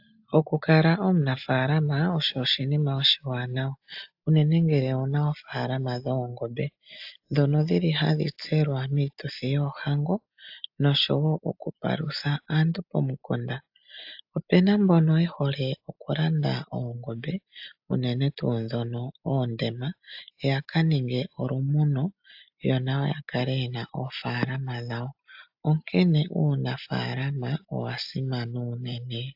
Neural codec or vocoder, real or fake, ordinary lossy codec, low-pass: none; real; AAC, 48 kbps; 5.4 kHz